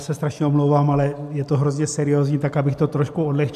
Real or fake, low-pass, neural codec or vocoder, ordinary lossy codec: real; 14.4 kHz; none; AAC, 96 kbps